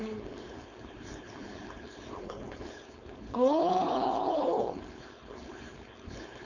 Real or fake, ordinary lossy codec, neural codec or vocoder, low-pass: fake; none; codec, 16 kHz, 4.8 kbps, FACodec; 7.2 kHz